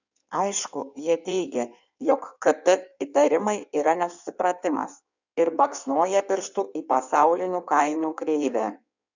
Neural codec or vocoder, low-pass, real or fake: codec, 16 kHz in and 24 kHz out, 1.1 kbps, FireRedTTS-2 codec; 7.2 kHz; fake